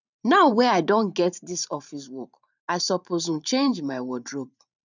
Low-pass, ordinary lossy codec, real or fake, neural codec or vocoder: 7.2 kHz; none; fake; vocoder, 44.1 kHz, 128 mel bands every 512 samples, BigVGAN v2